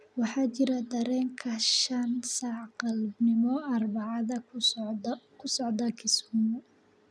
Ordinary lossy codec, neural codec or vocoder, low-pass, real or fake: none; none; none; real